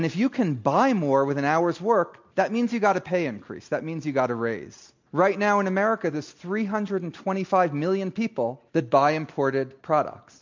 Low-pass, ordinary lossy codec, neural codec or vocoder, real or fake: 7.2 kHz; MP3, 64 kbps; none; real